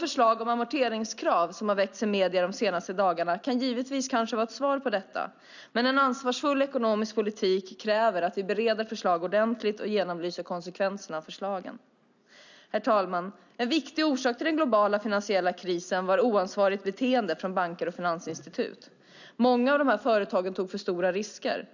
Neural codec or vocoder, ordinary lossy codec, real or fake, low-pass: none; none; real; 7.2 kHz